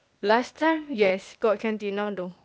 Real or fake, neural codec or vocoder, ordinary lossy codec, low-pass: fake; codec, 16 kHz, 0.8 kbps, ZipCodec; none; none